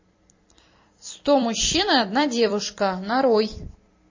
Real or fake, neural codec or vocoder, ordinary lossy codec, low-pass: fake; vocoder, 44.1 kHz, 128 mel bands every 256 samples, BigVGAN v2; MP3, 32 kbps; 7.2 kHz